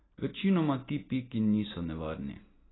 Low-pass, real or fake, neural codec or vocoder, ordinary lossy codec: 7.2 kHz; real; none; AAC, 16 kbps